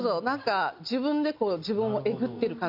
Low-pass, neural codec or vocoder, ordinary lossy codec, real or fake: 5.4 kHz; none; none; real